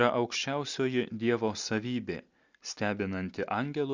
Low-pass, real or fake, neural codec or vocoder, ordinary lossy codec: 7.2 kHz; real; none; Opus, 64 kbps